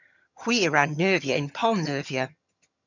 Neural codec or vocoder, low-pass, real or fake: vocoder, 22.05 kHz, 80 mel bands, HiFi-GAN; 7.2 kHz; fake